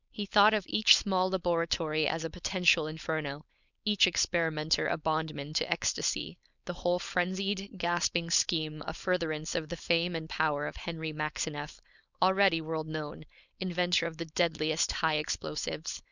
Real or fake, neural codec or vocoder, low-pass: fake; codec, 16 kHz, 4.8 kbps, FACodec; 7.2 kHz